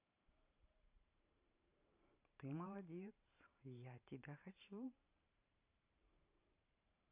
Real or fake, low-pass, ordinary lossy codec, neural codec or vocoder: fake; 3.6 kHz; AAC, 32 kbps; vocoder, 44.1 kHz, 128 mel bands every 512 samples, BigVGAN v2